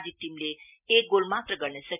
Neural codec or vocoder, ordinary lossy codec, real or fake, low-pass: none; none; real; 3.6 kHz